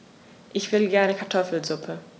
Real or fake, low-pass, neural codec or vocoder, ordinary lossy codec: real; none; none; none